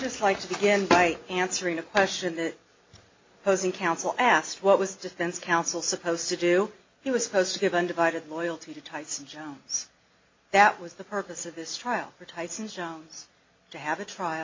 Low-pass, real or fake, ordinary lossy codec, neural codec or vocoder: 7.2 kHz; real; MP3, 32 kbps; none